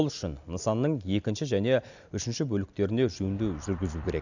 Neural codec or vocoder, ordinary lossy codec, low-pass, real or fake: none; none; 7.2 kHz; real